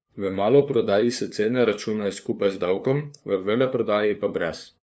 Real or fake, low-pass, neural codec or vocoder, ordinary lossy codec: fake; none; codec, 16 kHz, 2 kbps, FunCodec, trained on LibriTTS, 25 frames a second; none